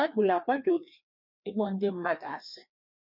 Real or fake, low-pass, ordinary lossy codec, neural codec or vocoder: fake; 5.4 kHz; none; codec, 16 kHz, 2 kbps, FreqCodec, larger model